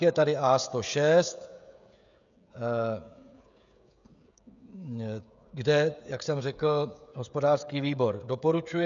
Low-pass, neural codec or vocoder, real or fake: 7.2 kHz; codec, 16 kHz, 16 kbps, FreqCodec, smaller model; fake